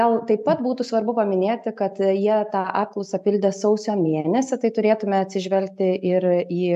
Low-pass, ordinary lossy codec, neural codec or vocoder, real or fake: 14.4 kHz; AAC, 96 kbps; none; real